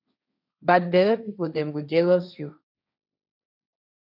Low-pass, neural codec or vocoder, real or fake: 5.4 kHz; codec, 16 kHz, 1.1 kbps, Voila-Tokenizer; fake